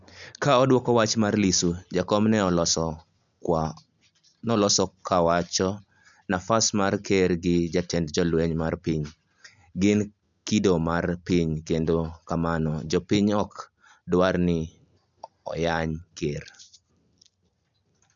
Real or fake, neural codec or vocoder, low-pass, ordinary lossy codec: real; none; 7.2 kHz; none